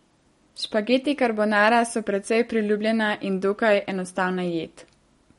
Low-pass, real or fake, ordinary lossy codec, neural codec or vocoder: 19.8 kHz; real; MP3, 48 kbps; none